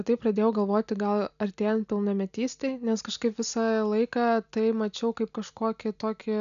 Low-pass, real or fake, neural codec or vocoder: 7.2 kHz; real; none